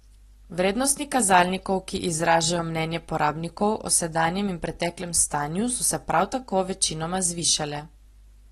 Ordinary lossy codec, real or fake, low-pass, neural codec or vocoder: AAC, 32 kbps; real; 19.8 kHz; none